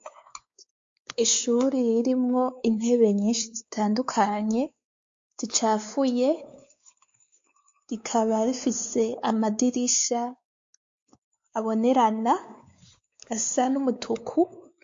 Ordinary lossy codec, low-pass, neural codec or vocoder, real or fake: MP3, 48 kbps; 7.2 kHz; codec, 16 kHz, 4 kbps, X-Codec, WavLM features, trained on Multilingual LibriSpeech; fake